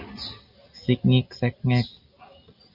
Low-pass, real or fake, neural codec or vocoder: 5.4 kHz; real; none